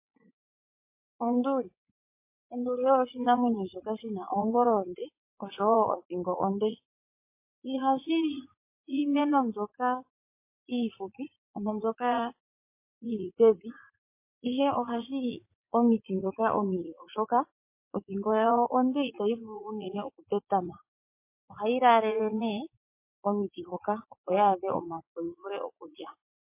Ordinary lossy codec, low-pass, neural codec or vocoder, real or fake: MP3, 24 kbps; 3.6 kHz; vocoder, 44.1 kHz, 80 mel bands, Vocos; fake